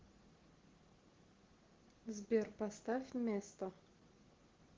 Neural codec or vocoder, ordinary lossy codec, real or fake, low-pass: none; Opus, 16 kbps; real; 7.2 kHz